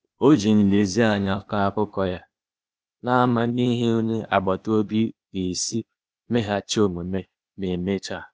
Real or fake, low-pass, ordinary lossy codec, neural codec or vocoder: fake; none; none; codec, 16 kHz, 0.8 kbps, ZipCodec